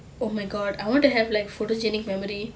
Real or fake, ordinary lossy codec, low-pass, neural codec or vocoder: real; none; none; none